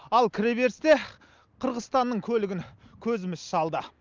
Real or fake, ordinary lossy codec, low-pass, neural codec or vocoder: real; Opus, 24 kbps; 7.2 kHz; none